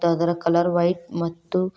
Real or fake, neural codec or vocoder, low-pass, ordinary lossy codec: real; none; none; none